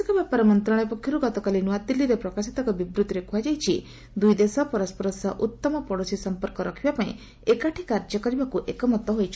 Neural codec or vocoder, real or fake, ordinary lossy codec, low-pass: none; real; none; none